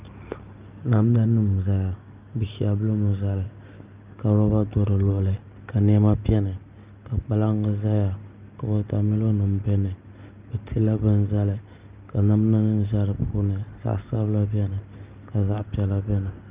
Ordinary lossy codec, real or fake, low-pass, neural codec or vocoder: Opus, 24 kbps; real; 3.6 kHz; none